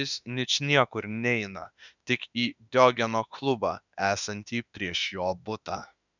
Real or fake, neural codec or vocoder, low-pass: fake; autoencoder, 48 kHz, 32 numbers a frame, DAC-VAE, trained on Japanese speech; 7.2 kHz